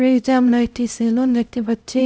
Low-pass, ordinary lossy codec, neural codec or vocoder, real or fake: none; none; codec, 16 kHz, 0.5 kbps, X-Codec, HuBERT features, trained on LibriSpeech; fake